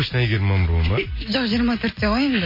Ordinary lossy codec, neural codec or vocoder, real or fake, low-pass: MP3, 24 kbps; none; real; 5.4 kHz